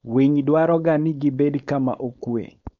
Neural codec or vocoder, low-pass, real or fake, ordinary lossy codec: codec, 16 kHz, 4.8 kbps, FACodec; 7.2 kHz; fake; MP3, 64 kbps